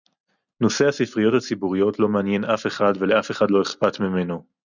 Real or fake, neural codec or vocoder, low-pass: real; none; 7.2 kHz